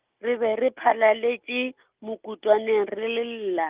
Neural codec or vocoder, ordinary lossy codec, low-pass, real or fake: none; Opus, 32 kbps; 3.6 kHz; real